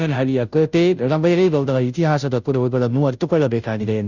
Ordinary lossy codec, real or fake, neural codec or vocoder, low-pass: none; fake; codec, 16 kHz, 0.5 kbps, FunCodec, trained on Chinese and English, 25 frames a second; 7.2 kHz